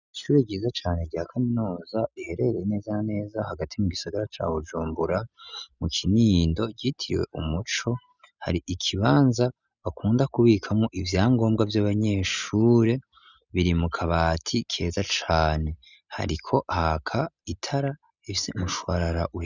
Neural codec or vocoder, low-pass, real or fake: none; 7.2 kHz; real